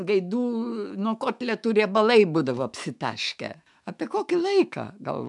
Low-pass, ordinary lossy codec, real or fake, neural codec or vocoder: 10.8 kHz; MP3, 96 kbps; fake; autoencoder, 48 kHz, 128 numbers a frame, DAC-VAE, trained on Japanese speech